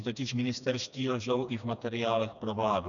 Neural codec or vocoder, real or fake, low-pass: codec, 16 kHz, 2 kbps, FreqCodec, smaller model; fake; 7.2 kHz